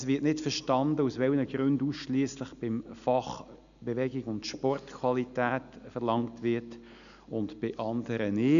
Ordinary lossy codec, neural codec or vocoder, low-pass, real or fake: none; none; 7.2 kHz; real